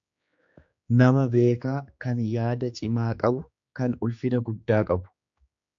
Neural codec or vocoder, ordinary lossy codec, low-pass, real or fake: codec, 16 kHz, 2 kbps, X-Codec, HuBERT features, trained on general audio; AAC, 64 kbps; 7.2 kHz; fake